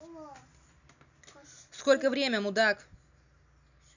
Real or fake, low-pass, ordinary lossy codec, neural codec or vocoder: real; 7.2 kHz; none; none